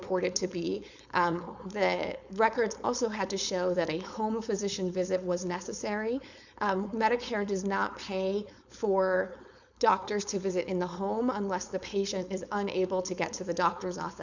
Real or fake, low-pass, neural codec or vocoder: fake; 7.2 kHz; codec, 16 kHz, 4.8 kbps, FACodec